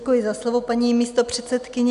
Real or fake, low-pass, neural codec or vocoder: real; 10.8 kHz; none